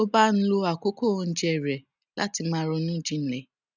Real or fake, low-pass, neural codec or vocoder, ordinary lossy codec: real; 7.2 kHz; none; none